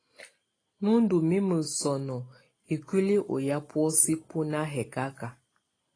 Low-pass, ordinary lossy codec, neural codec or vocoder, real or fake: 9.9 kHz; AAC, 32 kbps; none; real